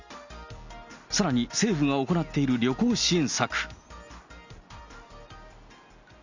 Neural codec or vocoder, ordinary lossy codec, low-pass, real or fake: none; Opus, 64 kbps; 7.2 kHz; real